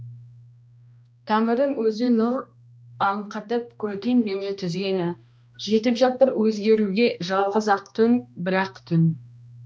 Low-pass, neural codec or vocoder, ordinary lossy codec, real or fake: none; codec, 16 kHz, 1 kbps, X-Codec, HuBERT features, trained on balanced general audio; none; fake